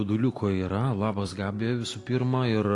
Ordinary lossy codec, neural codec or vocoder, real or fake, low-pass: AAC, 48 kbps; none; real; 10.8 kHz